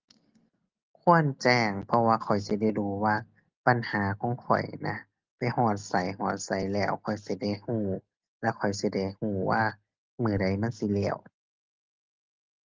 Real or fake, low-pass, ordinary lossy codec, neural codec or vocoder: real; 7.2 kHz; Opus, 32 kbps; none